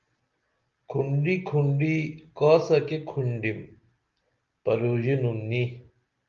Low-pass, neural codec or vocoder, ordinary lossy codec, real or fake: 7.2 kHz; none; Opus, 32 kbps; real